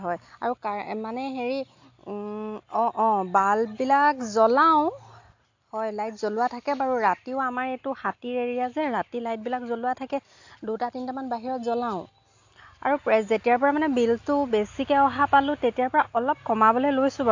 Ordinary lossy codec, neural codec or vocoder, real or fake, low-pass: AAC, 48 kbps; none; real; 7.2 kHz